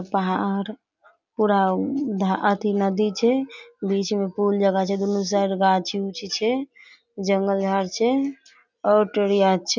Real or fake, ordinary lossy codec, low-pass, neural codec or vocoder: real; none; 7.2 kHz; none